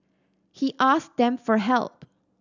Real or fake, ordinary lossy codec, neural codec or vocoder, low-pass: real; none; none; 7.2 kHz